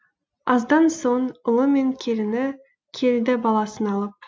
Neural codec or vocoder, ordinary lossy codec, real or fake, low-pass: none; none; real; none